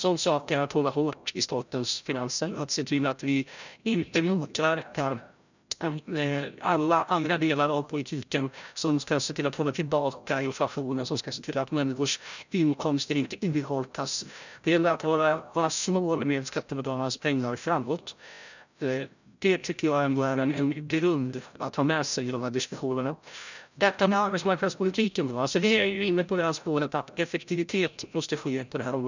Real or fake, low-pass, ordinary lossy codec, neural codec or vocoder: fake; 7.2 kHz; none; codec, 16 kHz, 0.5 kbps, FreqCodec, larger model